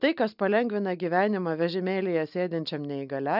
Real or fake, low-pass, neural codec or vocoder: real; 5.4 kHz; none